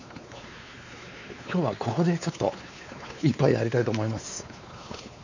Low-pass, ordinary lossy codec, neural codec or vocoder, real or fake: 7.2 kHz; none; codec, 16 kHz, 4 kbps, X-Codec, WavLM features, trained on Multilingual LibriSpeech; fake